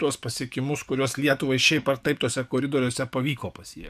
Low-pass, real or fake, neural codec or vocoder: 14.4 kHz; fake; codec, 44.1 kHz, 7.8 kbps, DAC